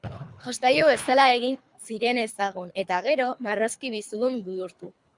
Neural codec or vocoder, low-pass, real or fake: codec, 24 kHz, 3 kbps, HILCodec; 10.8 kHz; fake